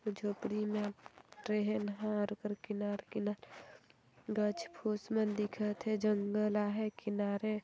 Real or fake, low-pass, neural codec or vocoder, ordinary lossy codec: real; none; none; none